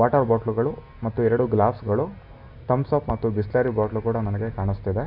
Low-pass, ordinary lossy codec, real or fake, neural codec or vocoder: 5.4 kHz; none; real; none